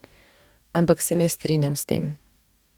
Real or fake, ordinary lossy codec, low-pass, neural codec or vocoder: fake; Opus, 64 kbps; 19.8 kHz; codec, 44.1 kHz, 2.6 kbps, DAC